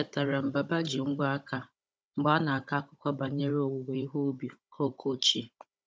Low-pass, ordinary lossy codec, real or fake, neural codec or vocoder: none; none; fake; codec, 16 kHz, 16 kbps, FunCodec, trained on Chinese and English, 50 frames a second